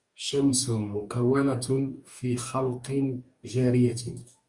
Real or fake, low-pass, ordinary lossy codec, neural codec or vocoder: fake; 10.8 kHz; Opus, 64 kbps; codec, 44.1 kHz, 2.6 kbps, DAC